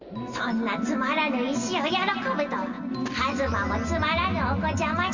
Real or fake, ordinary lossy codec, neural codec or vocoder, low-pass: real; Opus, 64 kbps; none; 7.2 kHz